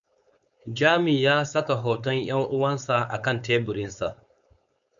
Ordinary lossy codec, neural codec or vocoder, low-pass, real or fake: MP3, 96 kbps; codec, 16 kHz, 4.8 kbps, FACodec; 7.2 kHz; fake